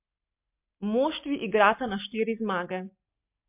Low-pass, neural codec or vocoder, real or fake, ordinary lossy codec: 3.6 kHz; none; real; MP3, 32 kbps